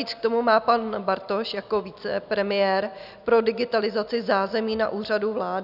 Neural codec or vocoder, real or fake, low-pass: none; real; 5.4 kHz